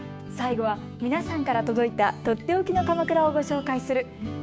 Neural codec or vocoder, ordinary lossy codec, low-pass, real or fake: codec, 16 kHz, 6 kbps, DAC; none; none; fake